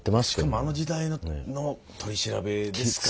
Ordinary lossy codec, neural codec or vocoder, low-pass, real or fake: none; none; none; real